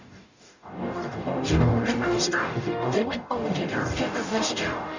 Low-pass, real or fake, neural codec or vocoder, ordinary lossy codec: 7.2 kHz; fake; codec, 44.1 kHz, 0.9 kbps, DAC; none